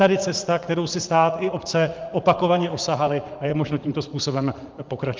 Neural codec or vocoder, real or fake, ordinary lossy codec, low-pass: vocoder, 44.1 kHz, 80 mel bands, Vocos; fake; Opus, 32 kbps; 7.2 kHz